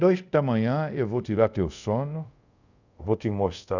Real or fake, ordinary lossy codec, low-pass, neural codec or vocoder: fake; none; 7.2 kHz; codec, 24 kHz, 0.5 kbps, DualCodec